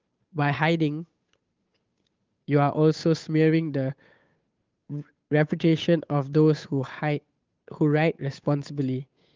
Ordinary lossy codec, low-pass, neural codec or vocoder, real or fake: Opus, 32 kbps; 7.2 kHz; codec, 16 kHz, 8 kbps, FunCodec, trained on Chinese and English, 25 frames a second; fake